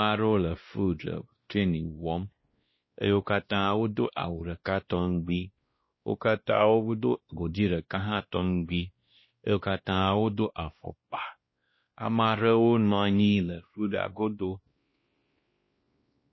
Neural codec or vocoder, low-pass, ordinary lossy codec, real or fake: codec, 16 kHz, 1 kbps, X-Codec, WavLM features, trained on Multilingual LibriSpeech; 7.2 kHz; MP3, 24 kbps; fake